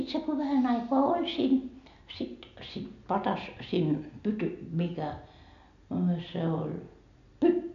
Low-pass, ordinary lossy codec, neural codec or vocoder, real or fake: 7.2 kHz; none; none; real